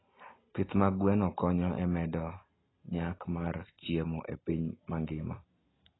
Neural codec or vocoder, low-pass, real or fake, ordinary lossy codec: none; 7.2 kHz; real; AAC, 16 kbps